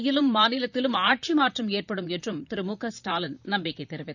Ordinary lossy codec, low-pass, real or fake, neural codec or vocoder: none; 7.2 kHz; fake; vocoder, 44.1 kHz, 128 mel bands, Pupu-Vocoder